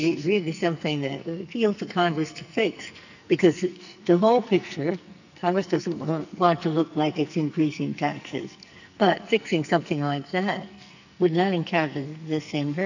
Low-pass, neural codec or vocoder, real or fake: 7.2 kHz; codec, 44.1 kHz, 2.6 kbps, SNAC; fake